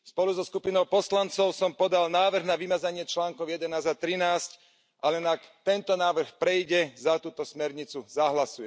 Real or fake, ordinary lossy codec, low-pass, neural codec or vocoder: real; none; none; none